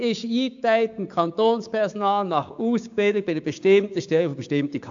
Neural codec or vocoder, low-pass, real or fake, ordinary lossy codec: codec, 16 kHz, 6 kbps, DAC; 7.2 kHz; fake; AAC, 64 kbps